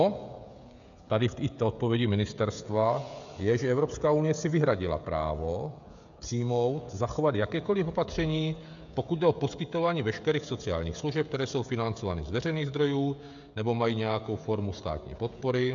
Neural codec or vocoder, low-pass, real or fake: codec, 16 kHz, 16 kbps, FreqCodec, smaller model; 7.2 kHz; fake